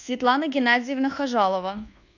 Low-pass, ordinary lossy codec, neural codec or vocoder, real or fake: 7.2 kHz; AAC, 48 kbps; codec, 24 kHz, 1.2 kbps, DualCodec; fake